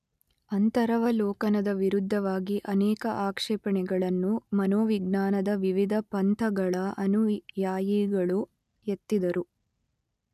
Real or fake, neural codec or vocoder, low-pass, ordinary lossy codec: real; none; 14.4 kHz; none